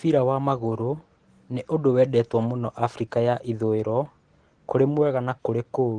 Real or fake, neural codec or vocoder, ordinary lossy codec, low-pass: real; none; Opus, 16 kbps; 9.9 kHz